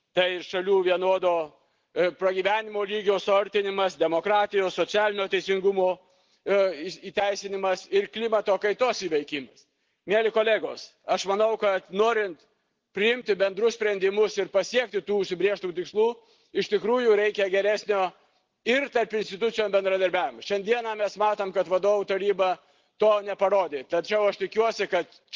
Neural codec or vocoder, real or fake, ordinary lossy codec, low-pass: none; real; Opus, 16 kbps; 7.2 kHz